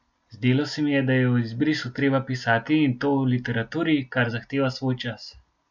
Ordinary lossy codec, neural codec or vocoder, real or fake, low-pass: none; none; real; 7.2 kHz